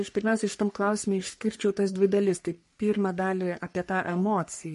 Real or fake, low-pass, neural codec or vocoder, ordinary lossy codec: fake; 14.4 kHz; codec, 44.1 kHz, 3.4 kbps, Pupu-Codec; MP3, 48 kbps